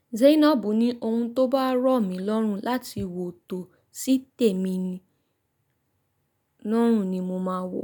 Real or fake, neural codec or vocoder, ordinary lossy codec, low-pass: real; none; none; none